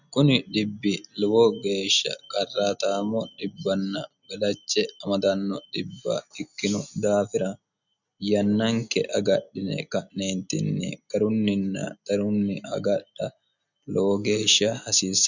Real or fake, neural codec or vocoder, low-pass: real; none; 7.2 kHz